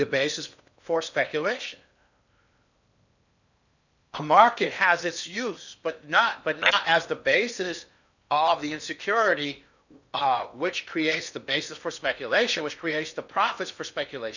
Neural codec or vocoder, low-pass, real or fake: codec, 16 kHz in and 24 kHz out, 0.8 kbps, FocalCodec, streaming, 65536 codes; 7.2 kHz; fake